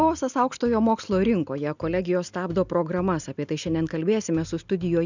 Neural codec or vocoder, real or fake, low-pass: none; real; 7.2 kHz